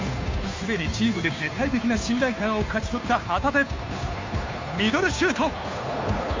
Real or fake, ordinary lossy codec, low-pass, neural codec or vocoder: fake; MP3, 64 kbps; 7.2 kHz; codec, 16 kHz, 2 kbps, FunCodec, trained on Chinese and English, 25 frames a second